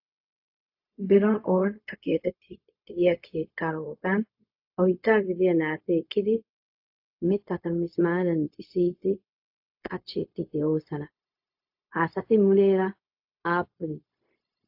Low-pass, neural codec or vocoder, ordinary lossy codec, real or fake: 5.4 kHz; codec, 16 kHz, 0.4 kbps, LongCat-Audio-Codec; AAC, 48 kbps; fake